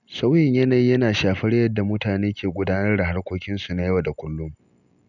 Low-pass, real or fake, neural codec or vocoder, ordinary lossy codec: 7.2 kHz; real; none; none